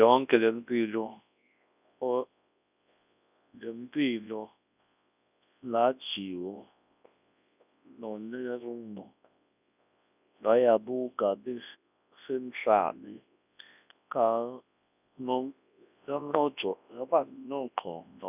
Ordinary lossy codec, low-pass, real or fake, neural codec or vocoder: none; 3.6 kHz; fake; codec, 24 kHz, 0.9 kbps, WavTokenizer, large speech release